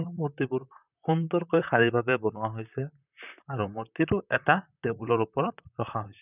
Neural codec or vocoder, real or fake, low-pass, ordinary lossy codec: vocoder, 44.1 kHz, 128 mel bands, Pupu-Vocoder; fake; 3.6 kHz; none